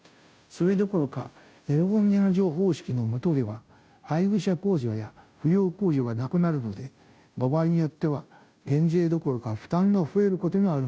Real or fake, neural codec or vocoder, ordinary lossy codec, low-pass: fake; codec, 16 kHz, 0.5 kbps, FunCodec, trained on Chinese and English, 25 frames a second; none; none